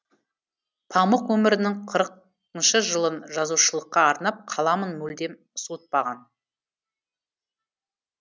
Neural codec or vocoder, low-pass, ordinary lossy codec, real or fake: none; none; none; real